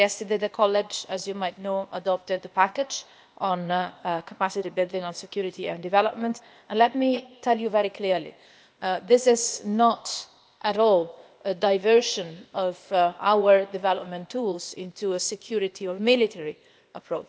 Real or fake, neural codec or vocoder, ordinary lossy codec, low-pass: fake; codec, 16 kHz, 0.8 kbps, ZipCodec; none; none